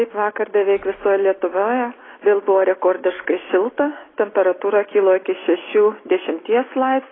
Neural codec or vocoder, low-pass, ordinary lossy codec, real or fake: none; 7.2 kHz; AAC, 16 kbps; real